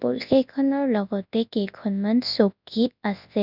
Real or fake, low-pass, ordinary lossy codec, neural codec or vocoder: fake; 5.4 kHz; none; codec, 24 kHz, 0.9 kbps, WavTokenizer, large speech release